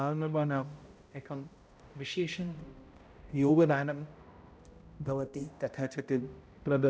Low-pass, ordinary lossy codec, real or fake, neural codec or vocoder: none; none; fake; codec, 16 kHz, 0.5 kbps, X-Codec, HuBERT features, trained on balanced general audio